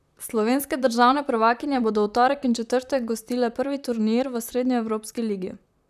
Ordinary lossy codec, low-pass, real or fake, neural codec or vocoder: none; 14.4 kHz; fake; vocoder, 44.1 kHz, 128 mel bands, Pupu-Vocoder